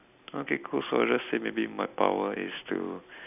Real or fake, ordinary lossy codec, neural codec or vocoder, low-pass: real; none; none; 3.6 kHz